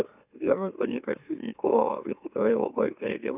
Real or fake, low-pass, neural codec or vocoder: fake; 3.6 kHz; autoencoder, 44.1 kHz, a latent of 192 numbers a frame, MeloTTS